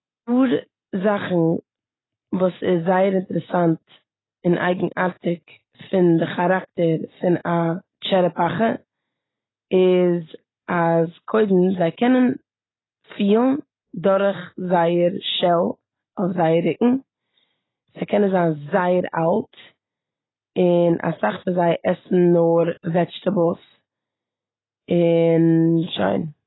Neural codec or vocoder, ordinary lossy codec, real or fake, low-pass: none; AAC, 16 kbps; real; 7.2 kHz